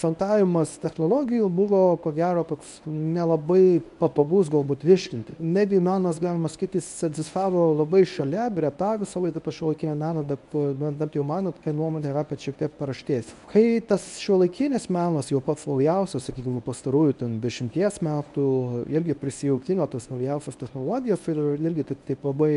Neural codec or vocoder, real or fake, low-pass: codec, 24 kHz, 0.9 kbps, WavTokenizer, medium speech release version 1; fake; 10.8 kHz